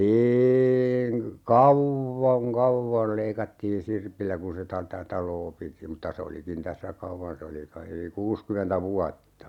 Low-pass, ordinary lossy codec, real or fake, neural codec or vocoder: 19.8 kHz; none; real; none